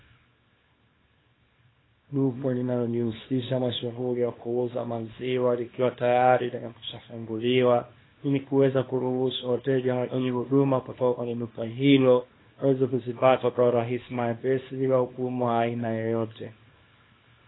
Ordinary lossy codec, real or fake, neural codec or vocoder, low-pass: AAC, 16 kbps; fake; codec, 24 kHz, 0.9 kbps, WavTokenizer, small release; 7.2 kHz